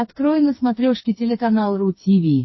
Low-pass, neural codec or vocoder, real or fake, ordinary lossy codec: 7.2 kHz; codec, 16 kHz, 4 kbps, FreqCodec, smaller model; fake; MP3, 24 kbps